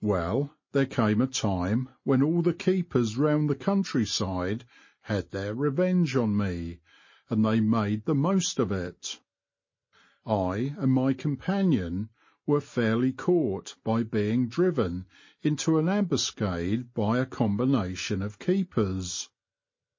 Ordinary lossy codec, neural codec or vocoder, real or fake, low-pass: MP3, 32 kbps; none; real; 7.2 kHz